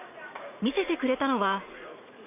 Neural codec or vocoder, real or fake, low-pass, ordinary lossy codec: vocoder, 44.1 kHz, 80 mel bands, Vocos; fake; 3.6 kHz; none